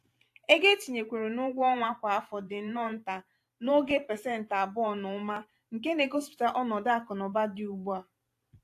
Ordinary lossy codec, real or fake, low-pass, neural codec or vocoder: AAC, 64 kbps; fake; 14.4 kHz; vocoder, 44.1 kHz, 128 mel bands every 512 samples, BigVGAN v2